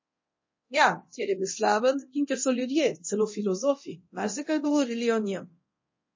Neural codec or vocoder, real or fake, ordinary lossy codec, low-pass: codec, 24 kHz, 0.9 kbps, DualCodec; fake; MP3, 32 kbps; 7.2 kHz